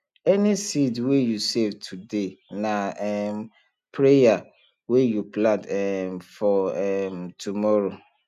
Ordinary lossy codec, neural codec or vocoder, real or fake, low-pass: none; none; real; 14.4 kHz